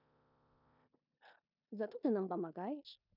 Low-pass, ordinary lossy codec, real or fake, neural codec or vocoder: 5.4 kHz; none; fake; codec, 16 kHz in and 24 kHz out, 0.9 kbps, LongCat-Audio-Codec, four codebook decoder